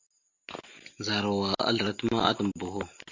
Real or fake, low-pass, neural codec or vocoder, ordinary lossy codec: real; 7.2 kHz; none; MP3, 48 kbps